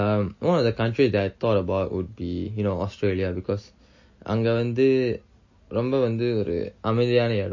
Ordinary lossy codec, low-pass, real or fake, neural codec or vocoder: MP3, 32 kbps; 7.2 kHz; real; none